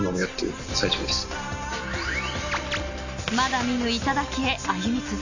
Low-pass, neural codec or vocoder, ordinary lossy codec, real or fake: 7.2 kHz; none; none; real